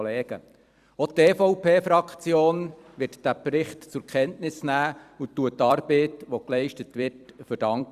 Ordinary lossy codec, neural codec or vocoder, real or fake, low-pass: Opus, 64 kbps; vocoder, 44.1 kHz, 128 mel bands every 256 samples, BigVGAN v2; fake; 14.4 kHz